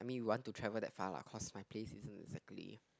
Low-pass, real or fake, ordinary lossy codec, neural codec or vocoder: none; real; none; none